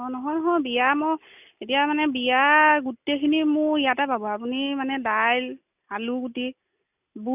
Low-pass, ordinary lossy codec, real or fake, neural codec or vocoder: 3.6 kHz; none; real; none